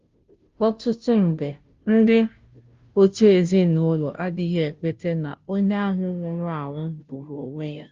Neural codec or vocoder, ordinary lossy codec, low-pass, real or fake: codec, 16 kHz, 0.5 kbps, FunCodec, trained on Chinese and English, 25 frames a second; Opus, 32 kbps; 7.2 kHz; fake